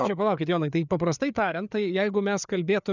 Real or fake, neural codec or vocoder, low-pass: fake; codec, 16 kHz, 4 kbps, FreqCodec, larger model; 7.2 kHz